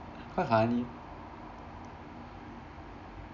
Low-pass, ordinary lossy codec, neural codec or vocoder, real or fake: 7.2 kHz; none; none; real